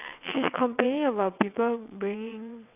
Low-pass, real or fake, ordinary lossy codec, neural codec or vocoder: 3.6 kHz; fake; none; vocoder, 22.05 kHz, 80 mel bands, WaveNeXt